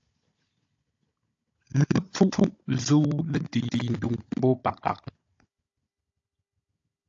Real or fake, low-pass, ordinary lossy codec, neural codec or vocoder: fake; 7.2 kHz; AAC, 48 kbps; codec, 16 kHz, 16 kbps, FunCodec, trained on Chinese and English, 50 frames a second